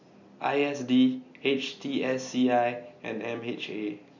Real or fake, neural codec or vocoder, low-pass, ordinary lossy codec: real; none; 7.2 kHz; none